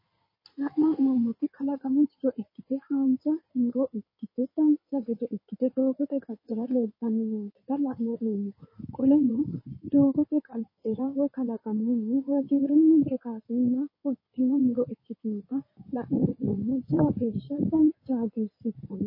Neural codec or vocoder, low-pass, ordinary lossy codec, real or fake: codec, 16 kHz in and 24 kHz out, 2.2 kbps, FireRedTTS-2 codec; 5.4 kHz; MP3, 24 kbps; fake